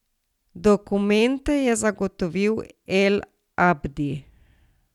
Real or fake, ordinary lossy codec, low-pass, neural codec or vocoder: real; none; 19.8 kHz; none